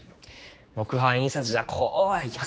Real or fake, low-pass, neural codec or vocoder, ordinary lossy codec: fake; none; codec, 16 kHz, 2 kbps, X-Codec, HuBERT features, trained on general audio; none